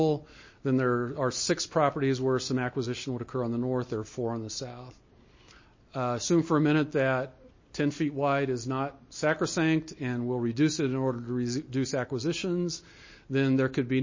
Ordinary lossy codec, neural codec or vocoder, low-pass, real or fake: MP3, 32 kbps; none; 7.2 kHz; real